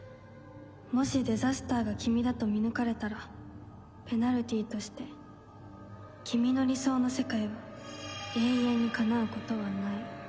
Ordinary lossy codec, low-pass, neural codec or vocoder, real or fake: none; none; none; real